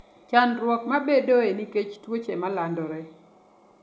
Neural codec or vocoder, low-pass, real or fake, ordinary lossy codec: none; none; real; none